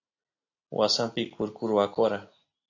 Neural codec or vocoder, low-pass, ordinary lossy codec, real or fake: none; 7.2 kHz; AAC, 48 kbps; real